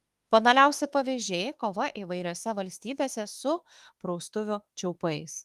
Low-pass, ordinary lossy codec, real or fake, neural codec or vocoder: 14.4 kHz; Opus, 24 kbps; fake; autoencoder, 48 kHz, 32 numbers a frame, DAC-VAE, trained on Japanese speech